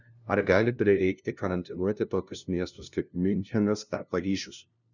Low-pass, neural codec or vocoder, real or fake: 7.2 kHz; codec, 16 kHz, 0.5 kbps, FunCodec, trained on LibriTTS, 25 frames a second; fake